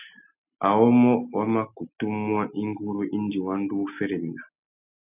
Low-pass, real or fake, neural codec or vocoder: 3.6 kHz; real; none